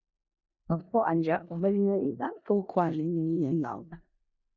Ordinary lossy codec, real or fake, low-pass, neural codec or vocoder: Opus, 64 kbps; fake; 7.2 kHz; codec, 16 kHz in and 24 kHz out, 0.4 kbps, LongCat-Audio-Codec, four codebook decoder